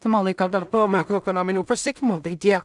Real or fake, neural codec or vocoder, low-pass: fake; codec, 16 kHz in and 24 kHz out, 0.4 kbps, LongCat-Audio-Codec, two codebook decoder; 10.8 kHz